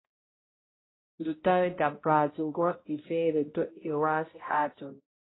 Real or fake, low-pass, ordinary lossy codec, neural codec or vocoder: fake; 7.2 kHz; AAC, 16 kbps; codec, 16 kHz, 0.5 kbps, X-Codec, HuBERT features, trained on balanced general audio